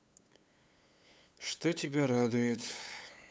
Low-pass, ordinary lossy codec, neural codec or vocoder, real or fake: none; none; codec, 16 kHz, 8 kbps, FunCodec, trained on LibriTTS, 25 frames a second; fake